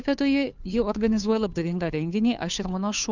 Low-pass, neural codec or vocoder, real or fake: 7.2 kHz; codec, 16 kHz, 2 kbps, FunCodec, trained on Chinese and English, 25 frames a second; fake